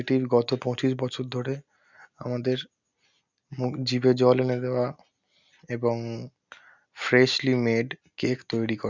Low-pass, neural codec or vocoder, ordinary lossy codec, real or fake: 7.2 kHz; none; none; real